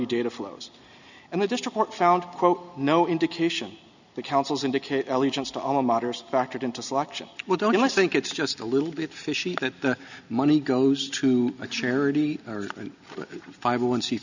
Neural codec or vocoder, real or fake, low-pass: none; real; 7.2 kHz